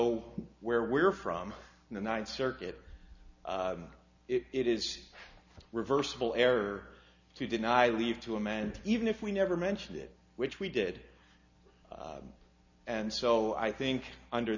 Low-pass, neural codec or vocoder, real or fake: 7.2 kHz; none; real